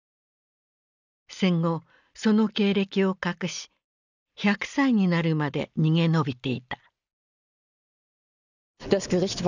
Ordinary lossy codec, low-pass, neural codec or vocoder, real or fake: none; 7.2 kHz; none; real